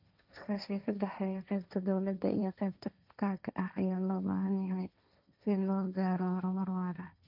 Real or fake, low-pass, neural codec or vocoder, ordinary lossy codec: fake; 5.4 kHz; codec, 16 kHz, 1.1 kbps, Voila-Tokenizer; none